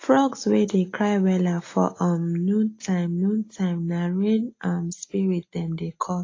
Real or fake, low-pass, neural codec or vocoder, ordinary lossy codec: real; 7.2 kHz; none; AAC, 32 kbps